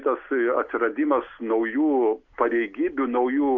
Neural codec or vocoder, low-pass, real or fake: none; 7.2 kHz; real